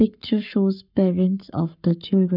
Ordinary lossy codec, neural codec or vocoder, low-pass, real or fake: none; codec, 44.1 kHz, 7.8 kbps, Pupu-Codec; 5.4 kHz; fake